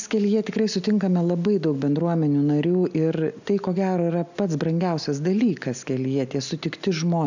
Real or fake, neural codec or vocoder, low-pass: real; none; 7.2 kHz